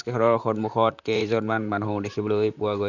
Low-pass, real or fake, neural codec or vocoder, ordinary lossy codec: 7.2 kHz; fake; vocoder, 44.1 kHz, 128 mel bands, Pupu-Vocoder; none